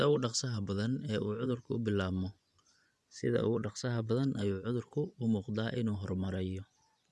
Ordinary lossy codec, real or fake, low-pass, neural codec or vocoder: none; fake; none; vocoder, 24 kHz, 100 mel bands, Vocos